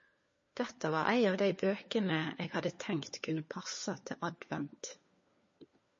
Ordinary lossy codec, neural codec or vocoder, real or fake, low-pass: MP3, 32 kbps; codec, 16 kHz, 4 kbps, FunCodec, trained on LibriTTS, 50 frames a second; fake; 7.2 kHz